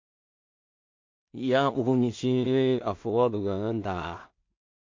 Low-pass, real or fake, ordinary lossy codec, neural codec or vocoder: 7.2 kHz; fake; MP3, 48 kbps; codec, 16 kHz in and 24 kHz out, 0.4 kbps, LongCat-Audio-Codec, two codebook decoder